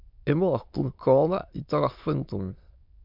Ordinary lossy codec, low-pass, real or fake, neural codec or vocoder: MP3, 48 kbps; 5.4 kHz; fake; autoencoder, 22.05 kHz, a latent of 192 numbers a frame, VITS, trained on many speakers